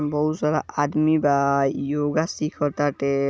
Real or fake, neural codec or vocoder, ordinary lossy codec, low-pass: real; none; none; none